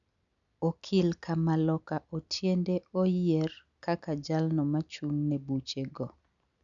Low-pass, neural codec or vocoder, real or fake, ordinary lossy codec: 7.2 kHz; none; real; none